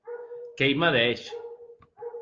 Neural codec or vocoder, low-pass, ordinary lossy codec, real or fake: none; 7.2 kHz; Opus, 24 kbps; real